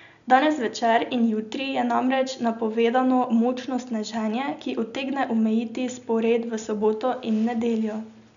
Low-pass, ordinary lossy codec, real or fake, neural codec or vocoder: 7.2 kHz; none; real; none